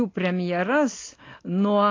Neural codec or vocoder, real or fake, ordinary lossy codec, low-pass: none; real; AAC, 32 kbps; 7.2 kHz